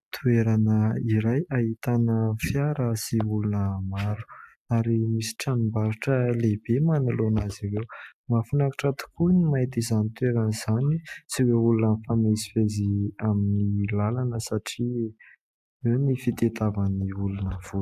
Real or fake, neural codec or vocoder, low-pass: real; none; 14.4 kHz